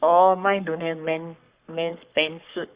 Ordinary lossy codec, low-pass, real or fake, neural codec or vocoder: Opus, 64 kbps; 3.6 kHz; fake; codec, 44.1 kHz, 3.4 kbps, Pupu-Codec